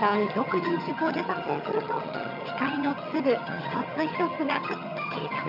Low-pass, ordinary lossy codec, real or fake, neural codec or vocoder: 5.4 kHz; none; fake; vocoder, 22.05 kHz, 80 mel bands, HiFi-GAN